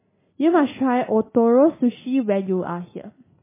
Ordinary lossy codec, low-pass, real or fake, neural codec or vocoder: MP3, 16 kbps; 3.6 kHz; real; none